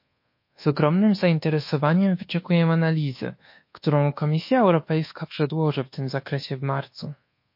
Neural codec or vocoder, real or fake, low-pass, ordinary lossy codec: codec, 24 kHz, 1.2 kbps, DualCodec; fake; 5.4 kHz; MP3, 32 kbps